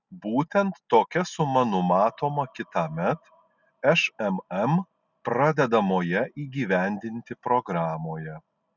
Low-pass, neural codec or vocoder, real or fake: 7.2 kHz; none; real